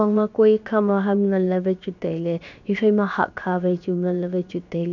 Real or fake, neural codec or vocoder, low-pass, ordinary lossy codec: fake; codec, 16 kHz, about 1 kbps, DyCAST, with the encoder's durations; 7.2 kHz; none